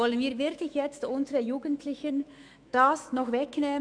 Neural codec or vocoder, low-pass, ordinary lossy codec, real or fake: autoencoder, 48 kHz, 128 numbers a frame, DAC-VAE, trained on Japanese speech; 9.9 kHz; none; fake